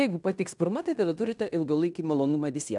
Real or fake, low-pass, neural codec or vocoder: fake; 10.8 kHz; codec, 16 kHz in and 24 kHz out, 0.9 kbps, LongCat-Audio-Codec, fine tuned four codebook decoder